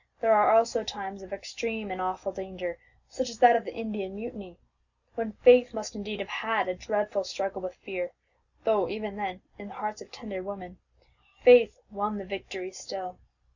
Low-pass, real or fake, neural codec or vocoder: 7.2 kHz; real; none